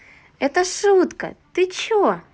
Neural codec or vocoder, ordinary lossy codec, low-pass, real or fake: none; none; none; real